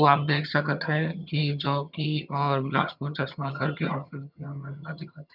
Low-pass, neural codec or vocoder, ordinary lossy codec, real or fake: 5.4 kHz; vocoder, 22.05 kHz, 80 mel bands, HiFi-GAN; none; fake